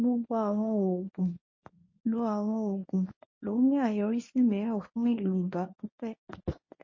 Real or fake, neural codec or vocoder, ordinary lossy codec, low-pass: fake; codec, 24 kHz, 0.9 kbps, WavTokenizer, medium speech release version 1; MP3, 32 kbps; 7.2 kHz